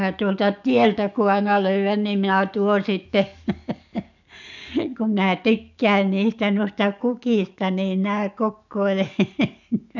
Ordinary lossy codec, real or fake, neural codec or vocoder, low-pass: none; fake; codec, 44.1 kHz, 7.8 kbps, DAC; 7.2 kHz